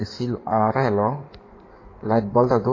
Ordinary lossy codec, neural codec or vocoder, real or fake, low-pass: AAC, 32 kbps; codec, 16 kHz in and 24 kHz out, 2.2 kbps, FireRedTTS-2 codec; fake; 7.2 kHz